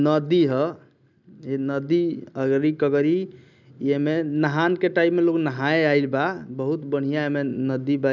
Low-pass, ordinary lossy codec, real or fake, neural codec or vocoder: 7.2 kHz; none; real; none